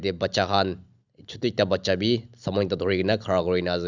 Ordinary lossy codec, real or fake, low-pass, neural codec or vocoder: Opus, 64 kbps; real; 7.2 kHz; none